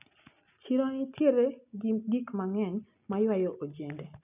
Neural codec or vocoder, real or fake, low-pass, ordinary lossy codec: none; real; 3.6 kHz; none